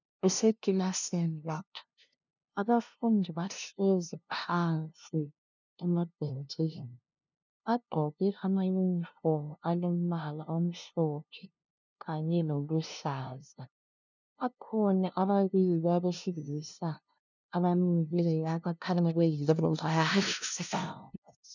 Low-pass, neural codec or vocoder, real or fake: 7.2 kHz; codec, 16 kHz, 0.5 kbps, FunCodec, trained on LibriTTS, 25 frames a second; fake